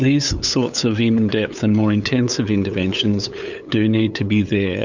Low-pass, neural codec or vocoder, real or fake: 7.2 kHz; codec, 16 kHz, 8 kbps, FunCodec, trained on LibriTTS, 25 frames a second; fake